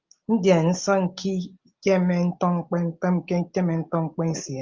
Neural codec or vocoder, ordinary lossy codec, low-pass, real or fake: none; Opus, 32 kbps; 7.2 kHz; real